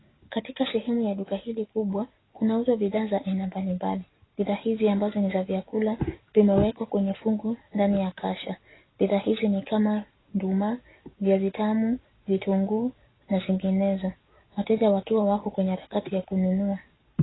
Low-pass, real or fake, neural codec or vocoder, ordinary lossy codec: 7.2 kHz; real; none; AAC, 16 kbps